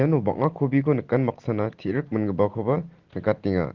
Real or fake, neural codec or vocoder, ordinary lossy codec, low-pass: real; none; Opus, 16 kbps; 7.2 kHz